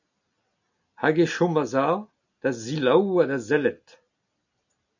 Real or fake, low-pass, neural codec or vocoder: real; 7.2 kHz; none